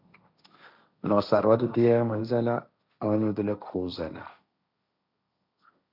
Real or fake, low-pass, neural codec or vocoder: fake; 5.4 kHz; codec, 16 kHz, 1.1 kbps, Voila-Tokenizer